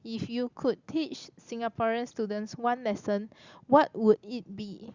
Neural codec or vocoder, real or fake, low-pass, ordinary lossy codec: none; real; 7.2 kHz; Opus, 64 kbps